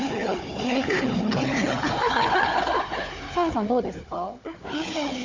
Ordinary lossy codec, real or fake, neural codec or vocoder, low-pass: MP3, 48 kbps; fake; codec, 16 kHz, 4 kbps, FunCodec, trained on Chinese and English, 50 frames a second; 7.2 kHz